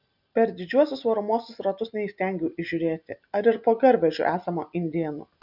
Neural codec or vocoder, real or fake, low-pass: none; real; 5.4 kHz